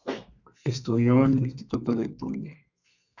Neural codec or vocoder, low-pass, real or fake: codec, 32 kHz, 1.9 kbps, SNAC; 7.2 kHz; fake